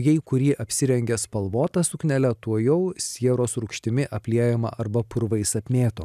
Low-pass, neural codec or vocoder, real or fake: 14.4 kHz; none; real